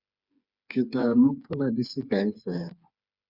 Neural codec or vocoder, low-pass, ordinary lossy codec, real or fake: codec, 16 kHz, 4 kbps, FreqCodec, smaller model; 5.4 kHz; Opus, 64 kbps; fake